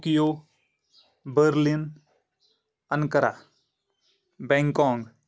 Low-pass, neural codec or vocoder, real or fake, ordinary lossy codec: none; none; real; none